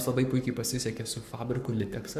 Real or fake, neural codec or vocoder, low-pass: fake; codec, 44.1 kHz, 7.8 kbps, Pupu-Codec; 14.4 kHz